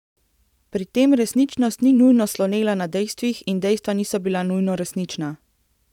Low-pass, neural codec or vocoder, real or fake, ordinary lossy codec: 19.8 kHz; vocoder, 44.1 kHz, 128 mel bands every 512 samples, BigVGAN v2; fake; none